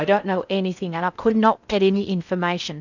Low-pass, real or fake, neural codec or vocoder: 7.2 kHz; fake; codec, 16 kHz in and 24 kHz out, 0.6 kbps, FocalCodec, streaming, 4096 codes